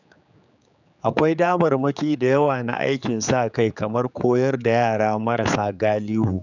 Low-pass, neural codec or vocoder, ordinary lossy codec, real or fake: 7.2 kHz; codec, 16 kHz, 4 kbps, X-Codec, HuBERT features, trained on general audio; none; fake